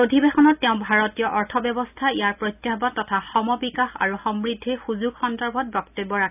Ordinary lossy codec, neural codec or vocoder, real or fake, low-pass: none; none; real; 3.6 kHz